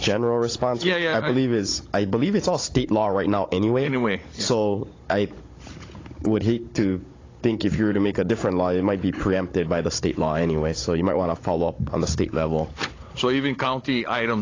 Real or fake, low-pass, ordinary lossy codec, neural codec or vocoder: fake; 7.2 kHz; AAC, 32 kbps; codec, 16 kHz, 16 kbps, FunCodec, trained on Chinese and English, 50 frames a second